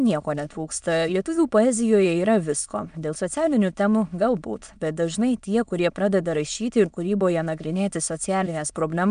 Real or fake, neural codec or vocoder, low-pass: fake; autoencoder, 22.05 kHz, a latent of 192 numbers a frame, VITS, trained on many speakers; 9.9 kHz